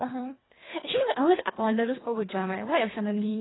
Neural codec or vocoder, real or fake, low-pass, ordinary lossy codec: codec, 24 kHz, 1.5 kbps, HILCodec; fake; 7.2 kHz; AAC, 16 kbps